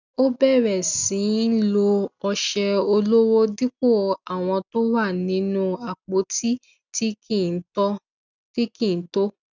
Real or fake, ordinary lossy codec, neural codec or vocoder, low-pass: real; none; none; 7.2 kHz